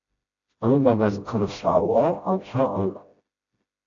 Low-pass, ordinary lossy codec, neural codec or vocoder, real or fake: 7.2 kHz; AAC, 48 kbps; codec, 16 kHz, 0.5 kbps, FreqCodec, smaller model; fake